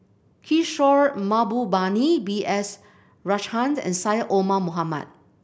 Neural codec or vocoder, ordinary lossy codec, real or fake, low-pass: none; none; real; none